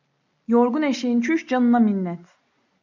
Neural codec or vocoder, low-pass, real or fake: none; 7.2 kHz; real